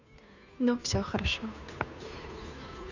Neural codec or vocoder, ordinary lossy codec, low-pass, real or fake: codec, 16 kHz, 2 kbps, FunCodec, trained on Chinese and English, 25 frames a second; none; 7.2 kHz; fake